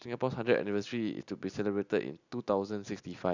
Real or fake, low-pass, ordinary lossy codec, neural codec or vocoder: real; 7.2 kHz; none; none